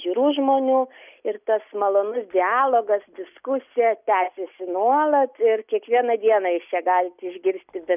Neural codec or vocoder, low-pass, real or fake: none; 3.6 kHz; real